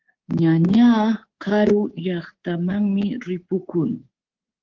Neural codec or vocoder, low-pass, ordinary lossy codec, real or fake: codec, 24 kHz, 3.1 kbps, DualCodec; 7.2 kHz; Opus, 16 kbps; fake